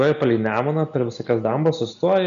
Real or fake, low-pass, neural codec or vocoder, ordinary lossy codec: real; 7.2 kHz; none; MP3, 96 kbps